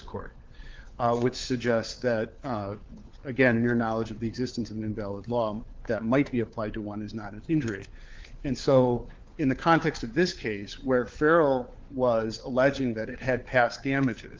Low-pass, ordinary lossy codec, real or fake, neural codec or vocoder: 7.2 kHz; Opus, 32 kbps; fake; codec, 16 kHz, 4 kbps, FunCodec, trained on LibriTTS, 50 frames a second